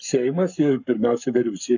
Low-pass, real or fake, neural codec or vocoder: 7.2 kHz; fake; codec, 44.1 kHz, 7.8 kbps, Pupu-Codec